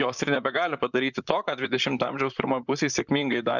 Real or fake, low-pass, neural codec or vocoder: fake; 7.2 kHz; vocoder, 44.1 kHz, 128 mel bands, Pupu-Vocoder